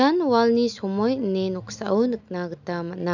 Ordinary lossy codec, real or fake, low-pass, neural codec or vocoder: none; real; 7.2 kHz; none